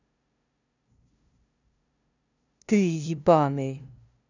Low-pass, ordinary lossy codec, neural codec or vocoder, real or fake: 7.2 kHz; none; codec, 16 kHz, 0.5 kbps, FunCodec, trained on LibriTTS, 25 frames a second; fake